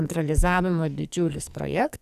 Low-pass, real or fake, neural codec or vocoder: 14.4 kHz; fake; codec, 44.1 kHz, 2.6 kbps, SNAC